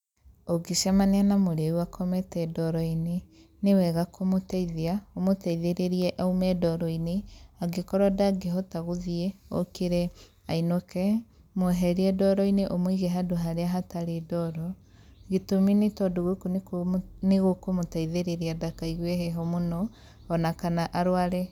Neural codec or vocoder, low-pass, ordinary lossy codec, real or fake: none; 19.8 kHz; none; real